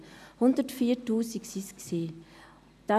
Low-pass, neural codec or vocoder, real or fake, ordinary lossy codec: 14.4 kHz; none; real; none